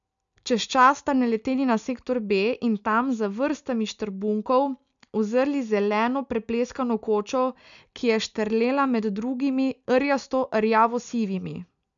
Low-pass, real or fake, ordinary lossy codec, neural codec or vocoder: 7.2 kHz; real; none; none